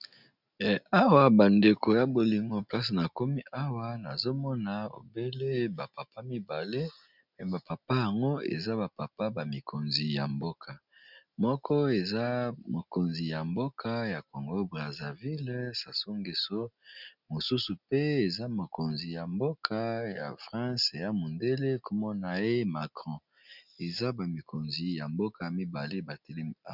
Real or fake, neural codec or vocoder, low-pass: real; none; 5.4 kHz